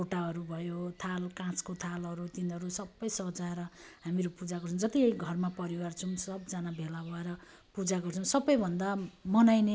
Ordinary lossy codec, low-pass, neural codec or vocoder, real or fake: none; none; none; real